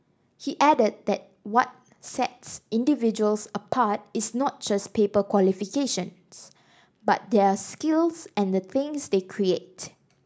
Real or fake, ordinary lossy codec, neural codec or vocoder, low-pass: real; none; none; none